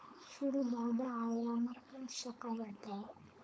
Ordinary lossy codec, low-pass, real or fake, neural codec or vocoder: none; none; fake; codec, 16 kHz, 4.8 kbps, FACodec